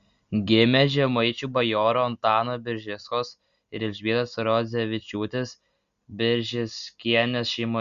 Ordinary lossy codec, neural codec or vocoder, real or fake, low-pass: Opus, 64 kbps; none; real; 7.2 kHz